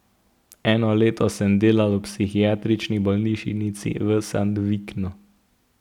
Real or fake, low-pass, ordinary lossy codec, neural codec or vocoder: real; 19.8 kHz; none; none